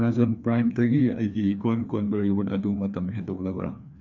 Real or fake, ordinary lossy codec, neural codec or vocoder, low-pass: fake; none; codec, 16 kHz, 2 kbps, FreqCodec, larger model; 7.2 kHz